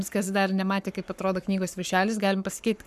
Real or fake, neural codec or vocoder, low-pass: fake; codec, 44.1 kHz, 7.8 kbps, Pupu-Codec; 14.4 kHz